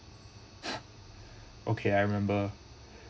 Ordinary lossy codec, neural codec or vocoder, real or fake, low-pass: none; none; real; none